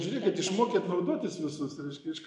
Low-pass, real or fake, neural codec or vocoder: 10.8 kHz; real; none